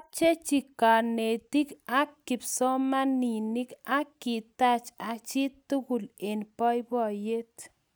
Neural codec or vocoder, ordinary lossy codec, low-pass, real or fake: none; none; none; real